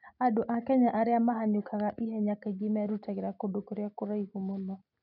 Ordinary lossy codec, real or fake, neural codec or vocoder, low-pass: none; real; none; 5.4 kHz